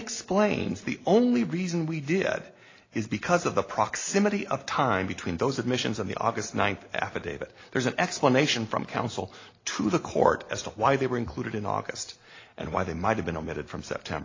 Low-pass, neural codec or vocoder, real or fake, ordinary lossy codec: 7.2 kHz; none; real; AAC, 32 kbps